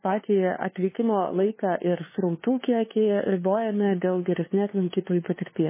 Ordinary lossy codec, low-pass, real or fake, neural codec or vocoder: MP3, 16 kbps; 3.6 kHz; fake; codec, 16 kHz, 2 kbps, FunCodec, trained on LibriTTS, 25 frames a second